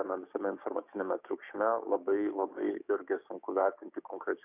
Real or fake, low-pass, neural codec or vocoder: fake; 3.6 kHz; codec, 16 kHz, 6 kbps, DAC